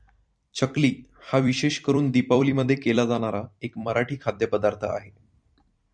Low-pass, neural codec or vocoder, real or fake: 9.9 kHz; vocoder, 44.1 kHz, 128 mel bands every 256 samples, BigVGAN v2; fake